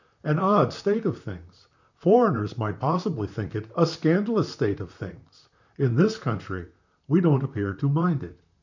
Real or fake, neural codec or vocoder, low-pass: fake; vocoder, 44.1 kHz, 128 mel bands, Pupu-Vocoder; 7.2 kHz